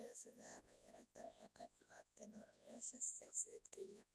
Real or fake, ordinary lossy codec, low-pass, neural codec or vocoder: fake; none; none; codec, 24 kHz, 0.9 kbps, WavTokenizer, large speech release